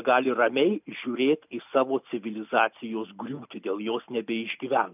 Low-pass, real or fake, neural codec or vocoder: 3.6 kHz; real; none